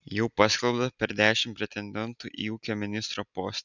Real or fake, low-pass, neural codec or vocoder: real; 7.2 kHz; none